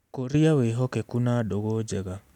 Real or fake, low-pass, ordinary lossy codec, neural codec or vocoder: real; 19.8 kHz; none; none